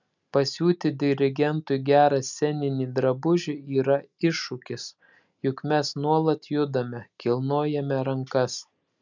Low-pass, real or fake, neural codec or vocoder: 7.2 kHz; real; none